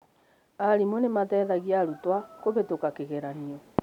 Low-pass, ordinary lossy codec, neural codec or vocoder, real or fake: 19.8 kHz; none; none; real